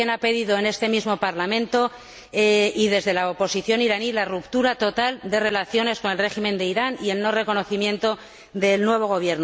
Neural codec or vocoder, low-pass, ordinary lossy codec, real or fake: none; none; none; real